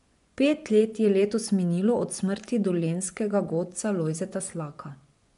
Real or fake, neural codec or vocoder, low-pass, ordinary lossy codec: fake; vocoder, 24 kHz, 100 mel bands, Vocos; 10.8 kHz; none